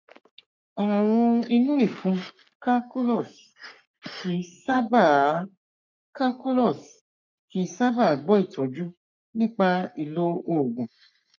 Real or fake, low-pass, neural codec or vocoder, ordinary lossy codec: fake; 7.2 kHz; codec, 44.1 kHz, 3.4 kbps, Pupu-Codec; none